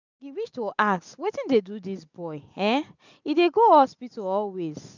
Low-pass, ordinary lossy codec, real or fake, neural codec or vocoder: 7.2 kHz; none; real; none